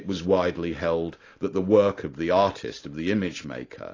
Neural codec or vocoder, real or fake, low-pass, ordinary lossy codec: none; real; 7.2 kHz; AAC, 32 kbps